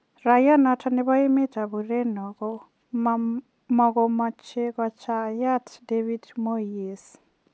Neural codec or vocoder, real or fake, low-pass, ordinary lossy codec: none; real; none; none